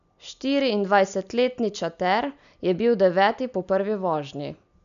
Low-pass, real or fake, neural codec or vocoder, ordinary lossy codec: 7.2 kHz; real; none; none